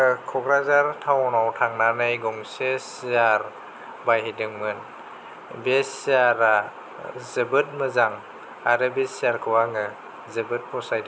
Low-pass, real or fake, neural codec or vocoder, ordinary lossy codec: none; real; none; none